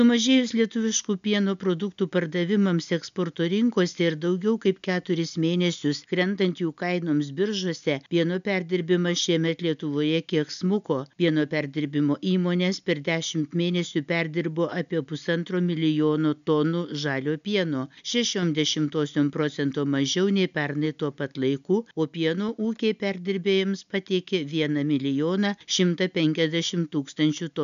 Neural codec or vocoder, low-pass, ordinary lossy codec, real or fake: none; 7.2 kHz; MP3, 96 kbps; real